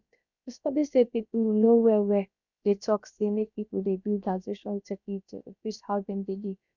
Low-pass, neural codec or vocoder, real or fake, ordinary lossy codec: 7.2 kHz; codec, 16 kHz, about 1 kbps, DyCAST, with the encoder's durations; fake; none